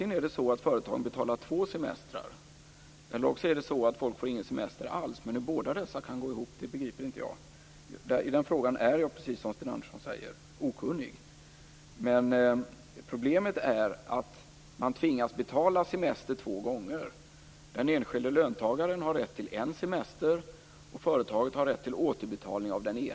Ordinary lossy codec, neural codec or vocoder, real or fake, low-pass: none; none; real; none